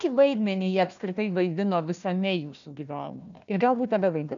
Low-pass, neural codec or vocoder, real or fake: 7.2 kHz; codec, 16 kHz, 1 kbps, FunCodec, trained on Chinese and English, 50 frames a second; fake